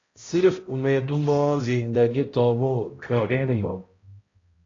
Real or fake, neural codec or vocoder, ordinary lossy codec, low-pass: fake; codec, 16 kHz, 0.5 kbps, X-Codec, HuBERT features, trained on balanced general audio; AAC, 32 kbps; 7.2 kHz